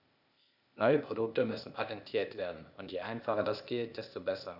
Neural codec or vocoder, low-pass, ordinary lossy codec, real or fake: codec, 16 kHz, 0.8 kbps, ZipCodec; 5.4 kHz; none; fake